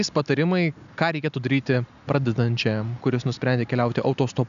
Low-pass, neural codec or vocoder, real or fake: 7.2 kHz; none; real